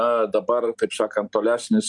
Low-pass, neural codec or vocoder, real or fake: 10.8 kHz; none; real